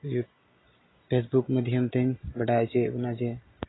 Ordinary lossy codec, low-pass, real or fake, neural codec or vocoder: AAC, 16 kbps; 7.2 kHz; real; none